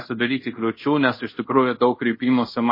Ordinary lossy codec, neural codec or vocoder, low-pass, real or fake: MP3, 24 kbps; codec, 24 kHz, 0.5 kbps, DualCodec; 5.4 kHz; fake